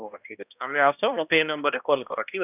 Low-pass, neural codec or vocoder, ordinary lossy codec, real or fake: 3.6 kHz; codec, 16 kHz, 1 kbps, X-Codec, HuBERT features, trained on balanced general audio; none; fake